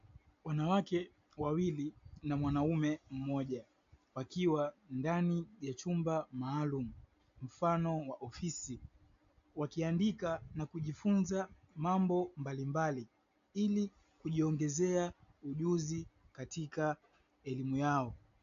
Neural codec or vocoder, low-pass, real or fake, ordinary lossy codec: none; 7.2 kHz; real; AAC, 64 kbps